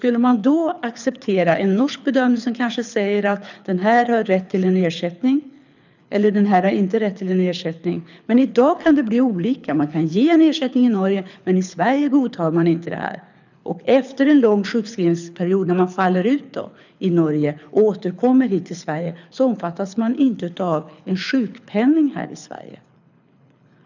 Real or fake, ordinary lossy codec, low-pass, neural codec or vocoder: fake; none; 7.2 kHz; codec, 24 kHz, 6 kbps, HILCodec